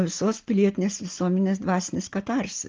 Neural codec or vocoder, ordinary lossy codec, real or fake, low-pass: none; Opus, 16 kbps; real; 7.2 kHz